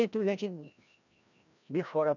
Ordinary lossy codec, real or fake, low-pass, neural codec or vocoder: none; fake; 7.2 kHz; codec, 16 kHz, 1 kbps, FreqCodec, larger model